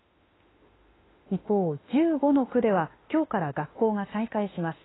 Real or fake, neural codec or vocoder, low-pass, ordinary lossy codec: fake; autoencoder, 48 kHz, 32 numbers a frame, DAC-VAE, trained on Japanese speech; 7.2 kHz; AAC, 16 kbps